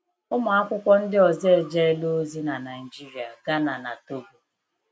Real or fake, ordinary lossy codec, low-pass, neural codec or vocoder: real; none; none; none